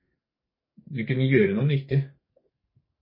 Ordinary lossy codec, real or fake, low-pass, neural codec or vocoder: MP3, 24 kbps; fake; 5.4 kHz; codec, 44.1 kHz, 2.6 kbps, SNAC